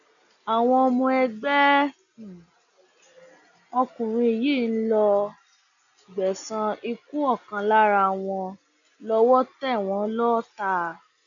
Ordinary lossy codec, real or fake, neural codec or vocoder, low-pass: none; real; none; 7.2 kHz